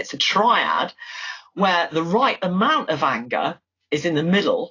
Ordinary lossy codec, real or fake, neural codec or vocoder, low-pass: AAC, 32 kbps; real; none; 7.2 kHz